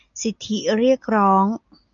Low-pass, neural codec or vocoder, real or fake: 7.2 kHz; none; real